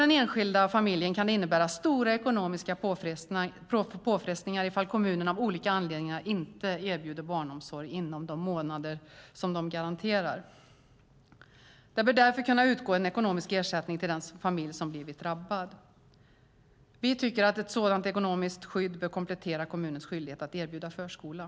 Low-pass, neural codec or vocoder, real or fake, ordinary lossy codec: none; none; real; none